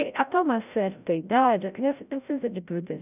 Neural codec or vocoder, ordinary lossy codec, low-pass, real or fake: codec, 16 kHz, 0.5 kbps, FreqCodec, larger model; none; 3.6 kHz; fake